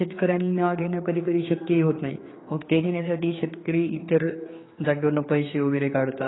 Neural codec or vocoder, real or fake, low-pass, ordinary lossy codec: codec, 16 kHz, 4 kbps, X-Codec, HuBERT features, trained on general audio; fake; 7.2 kHz; AAC, 16 kbps